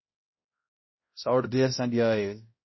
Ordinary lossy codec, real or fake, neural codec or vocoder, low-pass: MP3, 24 kbps; fake; codec, 16 kHz, 0.5 kbps, X-Codec, HuBERT features, trained on balanced general audio; 7.2 kHz